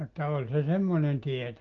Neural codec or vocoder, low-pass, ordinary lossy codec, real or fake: none; 7.2 kHz; Opus, 16 kbps; real